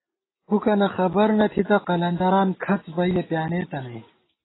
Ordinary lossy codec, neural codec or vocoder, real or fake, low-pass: AAC, 16 kbps; none; real; 7.2 kHz